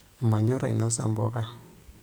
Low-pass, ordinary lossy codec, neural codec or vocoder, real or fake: none; none; codec, 44.1 kHz, 2.6 kbps, SNAC; fake